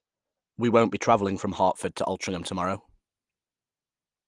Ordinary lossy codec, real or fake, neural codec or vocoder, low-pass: Opus, 24 kbps; real; none; 10.8 kHz